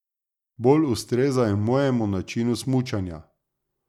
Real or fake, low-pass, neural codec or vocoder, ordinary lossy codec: real; 19.8 kHz; none; none